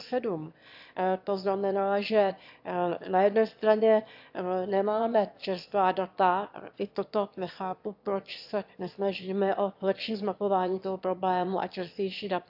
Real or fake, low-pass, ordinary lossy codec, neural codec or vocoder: fake; 5.4 kHz; AAC, 48 kbps; autoencoder, 22.05 kHz, a latent of 192 numbers a frame, VITS, trained on one speaker